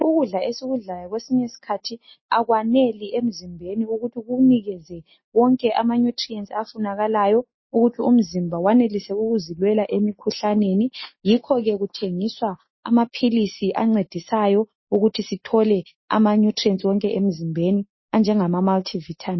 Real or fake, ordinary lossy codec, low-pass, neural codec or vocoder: real; MP3, 24 kbps; 7.2 kHz; none